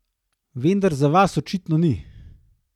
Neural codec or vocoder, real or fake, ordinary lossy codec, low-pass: none; real; none; 19.8 kHz